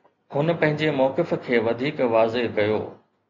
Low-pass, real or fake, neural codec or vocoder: 7.2 kHz; real; none